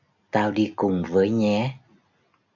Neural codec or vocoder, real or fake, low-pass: none; real; 7.2 kHz